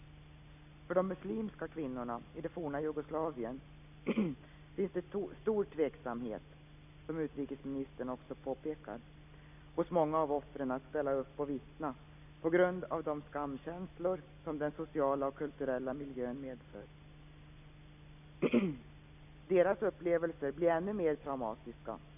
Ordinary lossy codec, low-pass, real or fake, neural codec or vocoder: none; 3.6 kHz; real; none